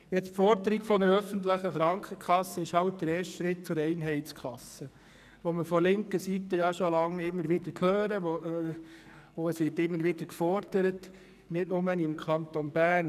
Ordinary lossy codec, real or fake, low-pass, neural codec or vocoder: none; fake; 14.4 kHz; codec, 44.1 kHz, 2.6 kbps, SNAC